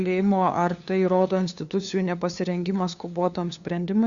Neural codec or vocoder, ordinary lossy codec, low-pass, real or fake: codec, 16 kHz, 2 kbps, FunCodec, trained on LibriTTS, 25 frames a second; Opus, 64 kbps; 7.2 kHz; fake